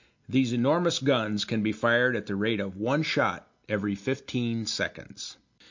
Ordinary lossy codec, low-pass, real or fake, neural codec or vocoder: MP3, 48 kbps; 7.2 kHz; real; none